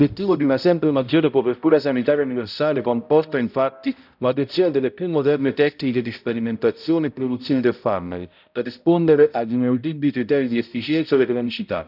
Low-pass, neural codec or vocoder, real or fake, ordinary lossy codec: 5.4 kHz; codec, 16 kHz, 0.5 kbps, X-Codec, HuBERT features, trained on balanced general audio; fake; none